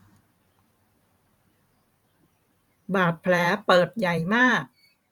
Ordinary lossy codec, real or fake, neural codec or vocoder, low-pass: none; fake; vocoder, 44.1 kHz, 128 mel bands every 512 samples, BigVGAN v2; 19.8 kHz